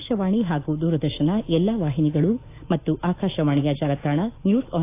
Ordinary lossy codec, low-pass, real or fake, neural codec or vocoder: AAC, 24 kbps; 3.6 kHz; fake; autoencoder, 48 kHz, 128 numbers a frame, DAC-VAE, trained on Japanese speech